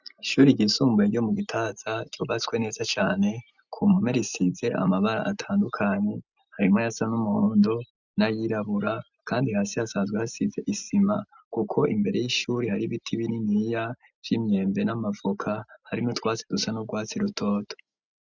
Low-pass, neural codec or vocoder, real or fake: 7.2 kHz; none; real